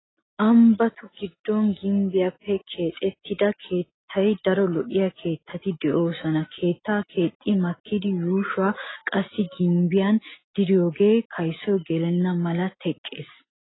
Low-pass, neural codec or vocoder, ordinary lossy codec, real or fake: 7.2 kHz; none; AAC, 16 kbps; real